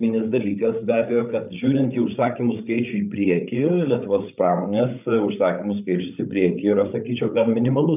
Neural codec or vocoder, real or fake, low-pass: codec, 16 kHz, 8 kbps, FreqCodec, larger model; fake; 3.6 kHz